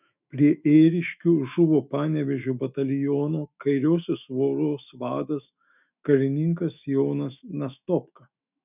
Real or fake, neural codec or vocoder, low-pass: fake; autoencoder, 48 kHz, 128 numbers a frame, DAC-VAE, trained on Japanese speech; 3.6 kHz